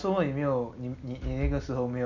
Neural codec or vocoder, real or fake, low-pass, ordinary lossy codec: none; real; 7.2 kHz; none